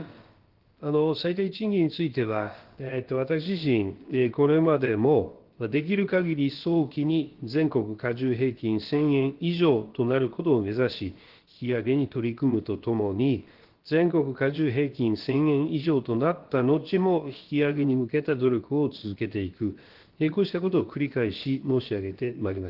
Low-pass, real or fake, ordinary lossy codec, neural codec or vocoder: 5.4 kHz; fake; Opus, 16 kbps; codec, 16 kHz, about 1 kbps, DyCAST, with the encoder's durations